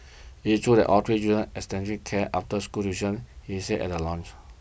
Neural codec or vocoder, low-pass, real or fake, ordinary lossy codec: none; none; real; none